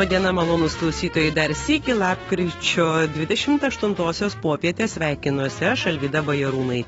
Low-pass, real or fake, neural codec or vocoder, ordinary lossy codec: 19.8 kHz; fake; autoencoder, 48 kHz, 128 numbers a frame, DAC-VAE, trained on Japanese speech; AAC, 24 kbps